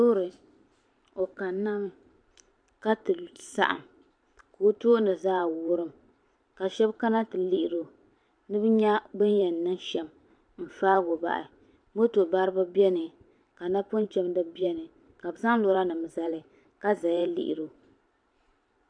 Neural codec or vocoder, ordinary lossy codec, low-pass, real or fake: vocoder, 44.1 kHz, 128 mel bands every 512 samples, BigVGAN v2; MP3, 64 kbps; 9.9 kHz; fake